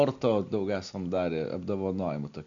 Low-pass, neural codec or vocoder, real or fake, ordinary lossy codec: 7.2 kHz; none; real; MP3, 64 kbps